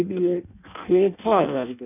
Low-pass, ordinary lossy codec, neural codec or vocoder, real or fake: 3.6 kHz; none; codec, 16 kHz in and 24 kHz out, 0.6 kbps, FireRedTTS-2 codec; fake